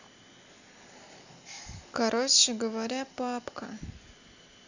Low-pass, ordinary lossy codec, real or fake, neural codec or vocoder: 7.2 kHz; none; real; none